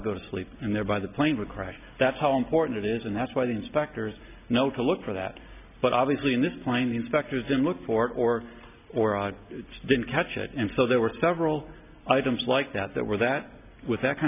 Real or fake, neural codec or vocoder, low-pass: real; none; 3.6 kHz